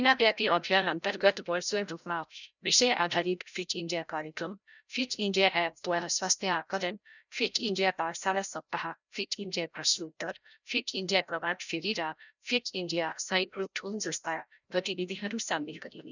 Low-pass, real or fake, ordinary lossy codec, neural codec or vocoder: 7.2 kHz; fake; none; codec, 16 kHz, 0.5 kbps, FreqCodec, larger model